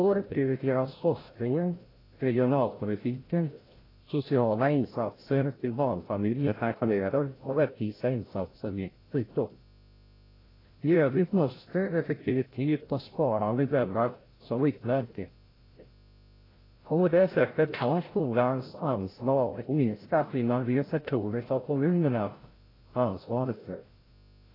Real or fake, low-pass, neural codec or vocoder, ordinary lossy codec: fake; 5.4 kHz; codec, 16 kHz, 0.5 kbps, FreqCodec, larger model; AAC, 24 kbps